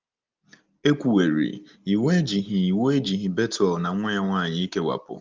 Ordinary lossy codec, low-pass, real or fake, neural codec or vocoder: Opus, 32 kbps; 7.2 kHz; real; none